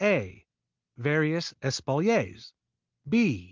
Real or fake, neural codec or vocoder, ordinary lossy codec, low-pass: real; none; Opus, 32 kbps; 7.2 kHz